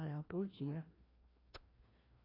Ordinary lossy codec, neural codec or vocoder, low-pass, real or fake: AAC, 24 kbps; codec, 16 kHz, 1 kbps, FreqCodec, larger model; 5.4 kHz; fake